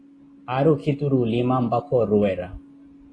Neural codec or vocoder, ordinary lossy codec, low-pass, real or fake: none; AAC, 32 kbps; 9.9 kHz; real